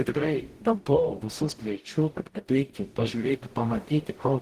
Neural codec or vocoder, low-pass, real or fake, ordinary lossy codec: codec, 44.1 kHz, 0.9 kbps, DAC; 14.4 kHz; fake; Opus, 16 kbps